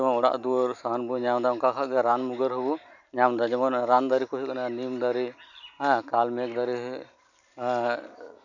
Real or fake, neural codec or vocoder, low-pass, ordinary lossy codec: real; none; 7.2 kHz; none